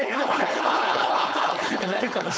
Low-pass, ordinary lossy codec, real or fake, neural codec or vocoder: none; none; fake; codec, 16 kHz, 4.8 kbps, FACodec